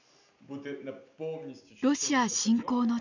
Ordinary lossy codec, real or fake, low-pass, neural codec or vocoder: none; real; 7.2 kHz; none